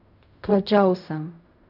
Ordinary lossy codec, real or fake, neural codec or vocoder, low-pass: none; fake; codec, 16 kHz in and 24 kHz out, 0.4 kbps, LongCat-Audio-Codec, fine tuned four codebook decoder; 5.4 kHz